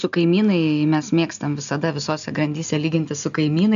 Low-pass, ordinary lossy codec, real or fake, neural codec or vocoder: 7.2 kHz; AAC, 96 kbps; real; none